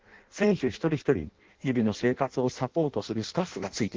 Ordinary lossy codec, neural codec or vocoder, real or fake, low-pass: Opus, 16 kbps; codec, 16 kHz in and 24 kHz out, 0.6 kbps, FireRedTTS-2 codec; fake; 7.2 kHz